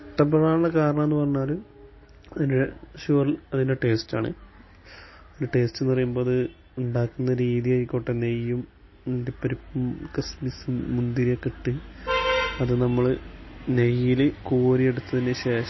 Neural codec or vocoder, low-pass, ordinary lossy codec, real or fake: none; 7.2 kHz; MP3, 24 kbps; real